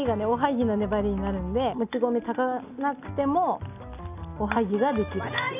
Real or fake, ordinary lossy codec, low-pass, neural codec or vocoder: real; none; 3.6 kHz; none